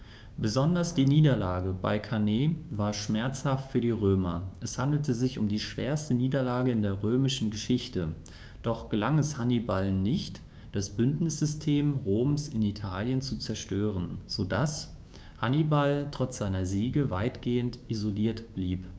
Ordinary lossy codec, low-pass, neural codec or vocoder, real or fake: none; none; codec, 16 kHz, 6 kbps, DAC; fake